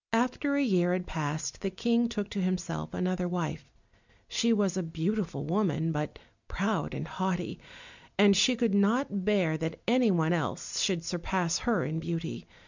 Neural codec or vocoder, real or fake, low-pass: none; real; 7.2 kHz